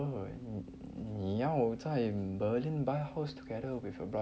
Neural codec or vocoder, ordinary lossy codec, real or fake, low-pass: none; none; real; none